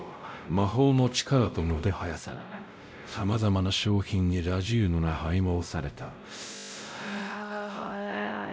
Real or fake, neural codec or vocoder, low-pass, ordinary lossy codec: fake; codec, 16 kHz, 0.5 kbps, X-Codec, WavLM features, trained on Multilingual LibriSpeech; none; none